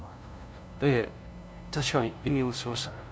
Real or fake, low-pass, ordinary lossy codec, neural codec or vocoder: fake; none; none; codec, 16 kHz, 0.5 kbps, FunCodec, trained on LibriTTS, 25 frames a second